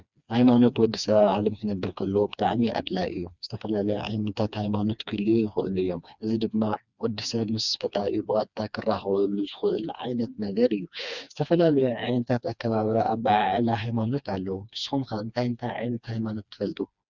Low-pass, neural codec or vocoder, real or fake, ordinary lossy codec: 7.2 kHz; codec, 16 kHz, 2 kbps, FreqCodec, smaller model; fake; Opus, 64 kbps